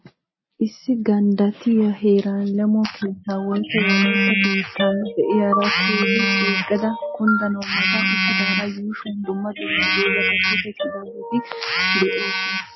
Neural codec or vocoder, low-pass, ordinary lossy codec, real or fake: none; 7.2 kHz; MP3, 24 kbps; real